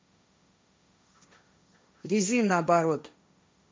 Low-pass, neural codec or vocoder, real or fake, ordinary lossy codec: none; codec, 16 kHz, 1.1 kbps, Voila-Tokenizer; fake; none